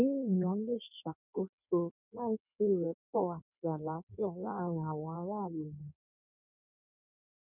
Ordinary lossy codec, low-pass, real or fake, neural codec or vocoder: none; 3.6 kHz; fake; codec, 16 kHz in and 24 kHz out, 1.1 kbps, FireRedTTS-2 codec